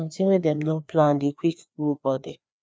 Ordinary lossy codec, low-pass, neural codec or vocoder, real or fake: none; none; codec, 16 kHz, 2 kbps, FreqCodec, larger model; fake